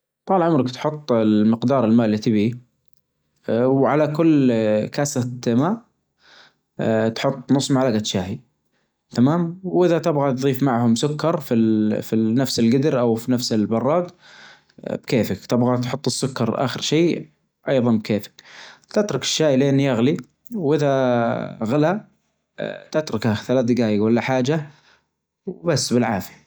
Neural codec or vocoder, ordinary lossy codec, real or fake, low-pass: none; none; real; none